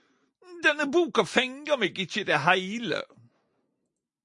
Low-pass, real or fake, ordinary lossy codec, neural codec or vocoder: 10.8 kHz; real; MP3, 48 kbps; none